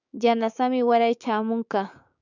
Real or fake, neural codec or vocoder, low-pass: fake; codec, 16 kHz, 6 kbps, DAC; 7.2 kHz